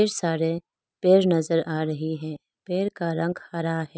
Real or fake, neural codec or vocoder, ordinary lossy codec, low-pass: real; none; none; none